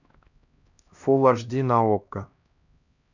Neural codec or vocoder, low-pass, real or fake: codec, 16 kHz, 1 kbps, X-Codec, HuBERT features, trained on LibriSpeech; 7.2 kHz; fake